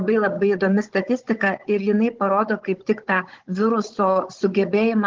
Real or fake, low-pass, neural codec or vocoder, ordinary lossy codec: fake; 7.2 kHz; codec, 16 kHz, 16 kbps, FunCodec, trained on Chinese and English, 50 frames a second; Opus, 16 kbps